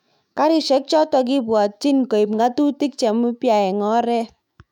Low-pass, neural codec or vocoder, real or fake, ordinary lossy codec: 19.8 kHz; autoencoder, 48 kHz, 128 numbers a frame, DAC-VAE, trained on Japanese speech; fake; none